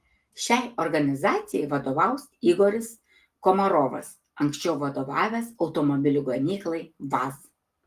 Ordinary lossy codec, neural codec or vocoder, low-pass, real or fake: Opus, 24 kbps; none; 14.4 kHz; real